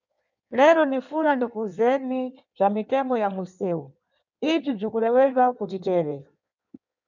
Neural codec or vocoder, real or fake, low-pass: codec, 16 kHz in and 24 kHz out, 1.1 kbps, FireRedTTS-2 codec; fake; 7.2 kHz